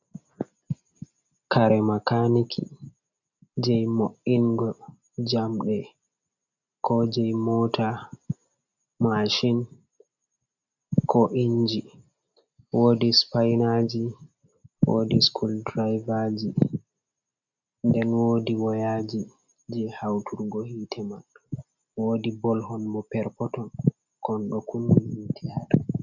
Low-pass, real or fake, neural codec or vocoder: 7.2 kHz; real; none